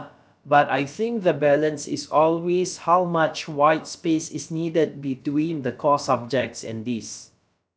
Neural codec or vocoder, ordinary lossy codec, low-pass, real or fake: codec, 16 kHz, about 1 kbps, DyCAST, with the encoder's durations; none; none; fake